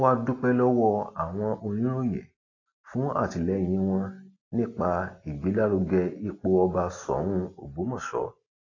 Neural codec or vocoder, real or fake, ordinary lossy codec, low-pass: none; real; AAC, 32 kbps; 7.2 kHz